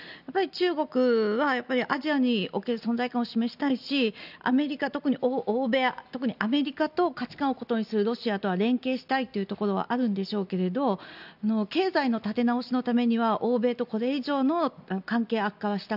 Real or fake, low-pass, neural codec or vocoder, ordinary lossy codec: real; 5.4 kHz; none; none